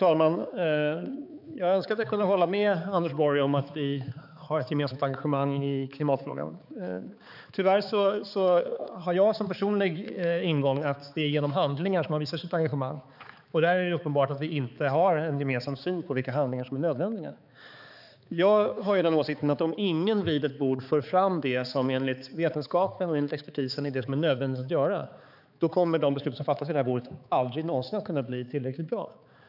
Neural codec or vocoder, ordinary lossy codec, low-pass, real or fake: codec, 16 kHz, 4 kbps, X-Codec, HuBERT features, trained on balanced general audio; none; 5.4 kHz; fake